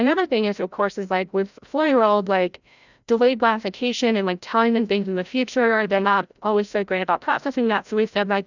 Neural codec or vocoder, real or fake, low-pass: codec, 16 kHz, 0.5 kbps, FreqCodec, larger model; fake; 7.2 kHz